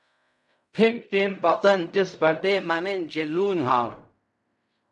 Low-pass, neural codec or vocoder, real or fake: 10.8 kHz; codec, 16 kHz in and 24 kHz out, 0.4 kbps, LongCat-Audio-Codec, fine tuned four codebook decoder; fake